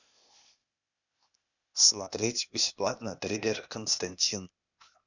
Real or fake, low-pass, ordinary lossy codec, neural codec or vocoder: fake; 7.2 kHz; none; codec, 16 kHz, 0.8 kbps, ZipCodec